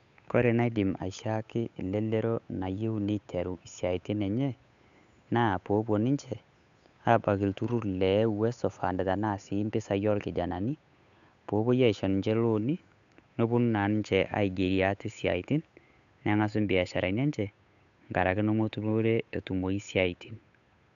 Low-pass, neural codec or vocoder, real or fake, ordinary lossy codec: 7.2 kHz; codec, 16 kHz, 8 kbps, FunCodec, trained on Chinese and English, 25 frames a second; fake; none